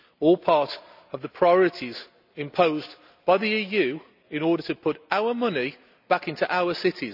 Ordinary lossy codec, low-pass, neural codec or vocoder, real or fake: none; 5.4 kHz; none; real